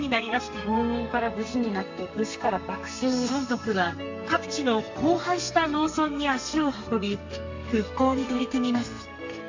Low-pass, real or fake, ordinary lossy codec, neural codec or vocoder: 7.2 kHz; fake; MP3, 64 kbps; codec, 32 kHz, 1.9 kbps, SNAC